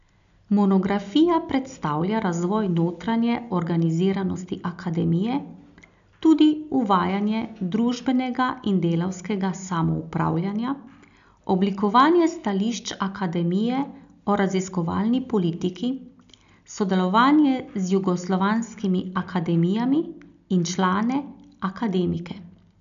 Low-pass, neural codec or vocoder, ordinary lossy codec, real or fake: 7.2 kHz; none; none; real